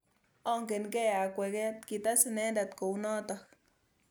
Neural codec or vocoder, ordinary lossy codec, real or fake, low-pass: none; none; real; none